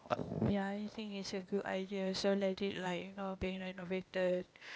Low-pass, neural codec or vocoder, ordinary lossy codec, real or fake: none; codec, 16 kHz, 0.8 kbps, ZipCodec; none; fake